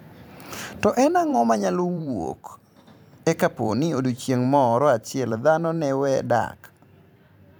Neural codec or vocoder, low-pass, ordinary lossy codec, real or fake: vocoder, 44.1 kHz, 128 mel bands every 512 samples, BigVGAN v2; none; none; fake